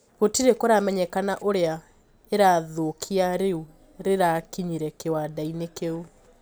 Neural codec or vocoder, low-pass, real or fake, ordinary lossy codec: none; none; real; none